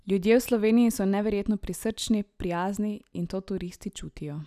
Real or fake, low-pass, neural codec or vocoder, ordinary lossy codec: real; 14.4 kHz; none; none